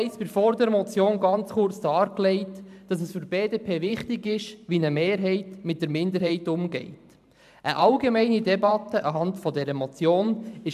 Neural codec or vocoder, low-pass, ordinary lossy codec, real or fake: vocoder, 44.1 kHz, 128 mel bands every 512 samples, BigVGAN v2; 14.4 kHz; AAC, 96 kbps; fake